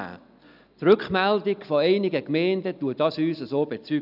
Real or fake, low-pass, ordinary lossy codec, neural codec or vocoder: real; 5.4 kHz; none; none